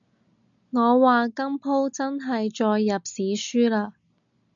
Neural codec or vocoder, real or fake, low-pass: none; real; 7.2 kHz